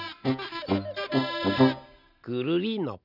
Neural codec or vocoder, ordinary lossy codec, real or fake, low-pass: none; none; real; 5.4 kHz